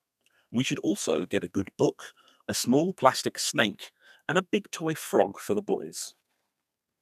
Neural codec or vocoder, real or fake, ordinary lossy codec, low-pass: codec, 32 kHz, 1.9 kbps, SNAC; fake; none; 14.4 kHz